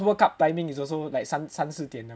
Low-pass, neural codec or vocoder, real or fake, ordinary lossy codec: none; none; real; none